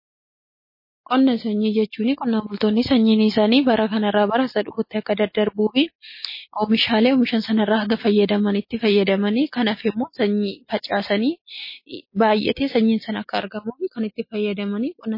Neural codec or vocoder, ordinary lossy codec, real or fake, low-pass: none; MP3, 24 kbps; real; 5.4 kHz